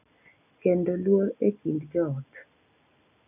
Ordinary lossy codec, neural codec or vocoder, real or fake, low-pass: none; none; real; 3.6 kHz